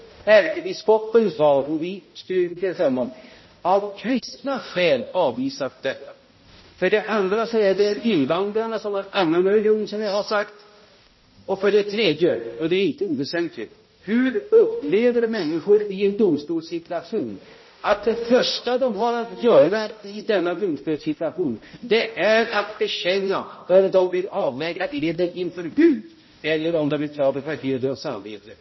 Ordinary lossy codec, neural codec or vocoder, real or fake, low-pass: MP3, 24 kbps; codec, 16 kHz, 0.5 kbps, X-Codec, HuBERT features, trained on balanced general audio; fake; 7.2 kHz